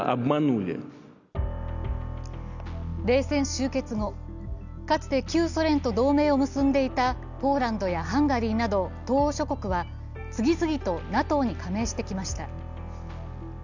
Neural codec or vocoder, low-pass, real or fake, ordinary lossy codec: none; 7.2 kHz; real; none